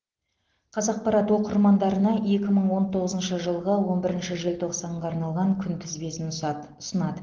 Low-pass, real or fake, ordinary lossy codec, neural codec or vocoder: 7.2 kHz; real; Opus, 16 kbps; none